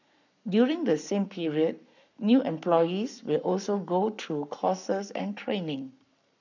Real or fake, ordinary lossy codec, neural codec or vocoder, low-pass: fake; none; codec, 44.1 kHz, 7.8 kbps, Pupu-Codec; 7.2 kHz